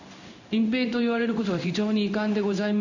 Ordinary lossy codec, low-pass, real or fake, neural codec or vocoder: none; 7.2 kHz; fake; codec, 16 kHz in and 24 kHz out, 1 kbps, XY-Tokenizer